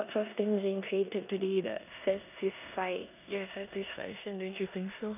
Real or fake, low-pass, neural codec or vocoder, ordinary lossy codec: fake; 3.6 kHz; codec, 16 kHz in and 24 kHz out, 0.9 kbps, LongCat-Audio-Codec, four codebook decoder; none